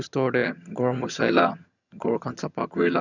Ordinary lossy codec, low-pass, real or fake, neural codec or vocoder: none; 7.2 kHz; fake; vocoder, 22.05 kHz, 80 mel bands, HiFi-GAN